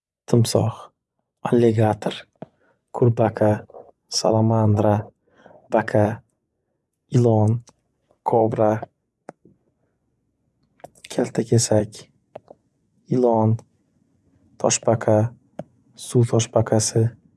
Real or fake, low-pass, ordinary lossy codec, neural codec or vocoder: fake; none; none; vocoder, 24 kHz, 100 mel bands, Vocos